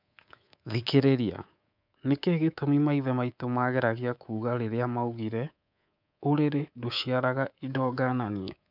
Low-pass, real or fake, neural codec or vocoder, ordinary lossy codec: 5.4 kHz; fake; codec, 24 kHz, 3.1 kbps, DualCodec; none